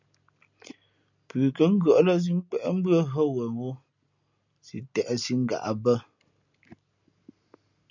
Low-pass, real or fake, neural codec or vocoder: 7.2 kHz; real; none